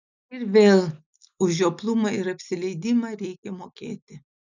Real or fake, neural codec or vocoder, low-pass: real; none; 7.2 kHz